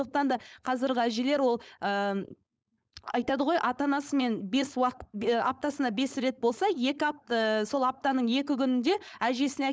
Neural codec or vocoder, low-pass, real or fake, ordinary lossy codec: codec, 16 kHz, 4.8 kbps, FACodec; none; fake; none